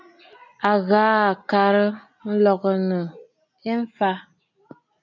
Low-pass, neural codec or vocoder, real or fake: 7.2 kHz; none; real